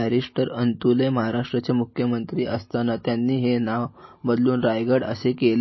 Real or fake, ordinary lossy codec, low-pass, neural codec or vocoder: real; MP3, 24 kbps; 7.2 kHz; none